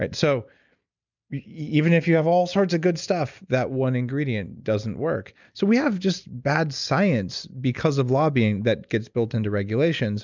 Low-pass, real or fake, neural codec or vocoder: 7.2 kHz; real; none